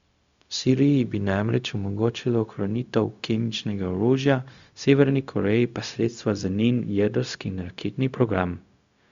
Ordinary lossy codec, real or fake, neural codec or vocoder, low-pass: Opus, 64 kbps; fake; codec, 16 kHz, 0.4 kbps, LongCat-Audio-Codec; 7.2 kHz